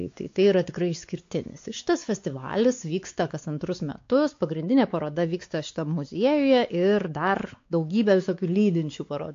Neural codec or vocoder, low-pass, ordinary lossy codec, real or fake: codec, 16 kHz, 4 kbps, X-Codec, WavLM features, trained on Multilingual LibriSpeech; 7.2 kHz; AAC, 48 kbps; fake